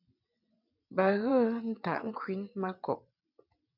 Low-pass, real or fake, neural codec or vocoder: 5.4 kHz; fake; vocoder, 22.05 kHz, 80 mel bands, WaveNeXt